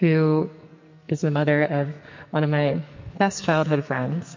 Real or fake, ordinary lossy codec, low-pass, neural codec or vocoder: fake; MP3, 48 kbps; 7.2 kHz; codec, 44.1 kHz, 2.6 kbps, SNAC